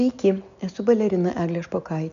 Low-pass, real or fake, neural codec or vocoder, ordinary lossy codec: 7.2 kHz; real; none; AAC, 96 kbps